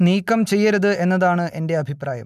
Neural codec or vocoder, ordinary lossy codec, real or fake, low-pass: none; MP3, 96 kbps; real; 14.4 kHz